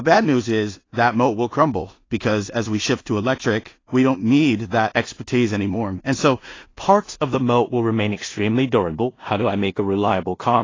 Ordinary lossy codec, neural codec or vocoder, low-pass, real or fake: AAC, 32 kbps; codec, 16 kHz in and 24 kHz out, 0.4 kbps, LongCat-Audio-Codec, two codebook decoder; 7.2 kHz; fake